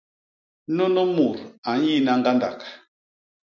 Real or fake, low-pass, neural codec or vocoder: real; 7.2 kHz; none